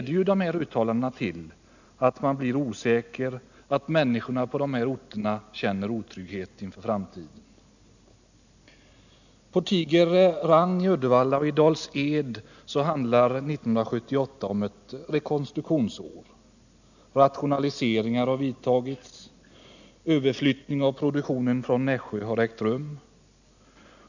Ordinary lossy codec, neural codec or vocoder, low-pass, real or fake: none; none; 7.2 kHz; real